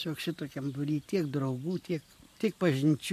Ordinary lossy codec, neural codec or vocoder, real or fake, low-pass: MP3, 64 kbps; vocoder, 44.1 kHz, 128 mel bands every 512 samples, BigVGAN v2; fake; 14.4 kHz